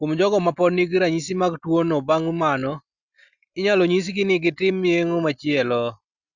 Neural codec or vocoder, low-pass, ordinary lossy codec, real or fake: none; 7.2 kHz; Opus, 64 kbps; real